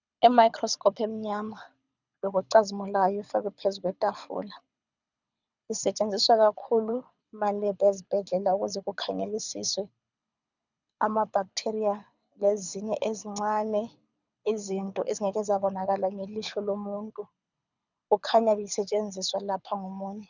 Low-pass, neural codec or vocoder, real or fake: 7.2 kHz; codec, 24 kHz, 6 kbps, HILCodec; fake